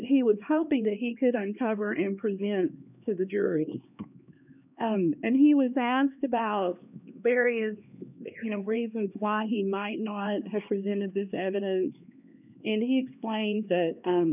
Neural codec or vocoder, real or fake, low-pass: codec, 16 kHz, 2 kbps, X-Codec, HuBERT features, trained on LibriSpeech; fake; 3.6 kHz